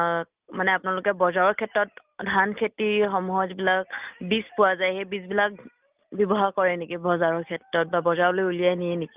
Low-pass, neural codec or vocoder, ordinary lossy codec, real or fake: 3.6 kHz; none; Opus, 24 kbps; real